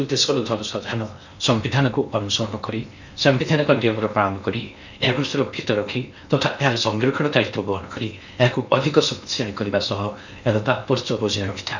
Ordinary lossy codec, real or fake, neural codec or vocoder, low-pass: none; fake; codec, 16 kHz in and 24 kHz out, 0.8 kbps, FocalCodec, streaming, 65536 codes; 7.2 kHz